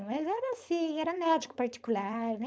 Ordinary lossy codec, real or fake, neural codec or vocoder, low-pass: none; fake; codec, 16 kHz, 4.8 kbps, FACodec; none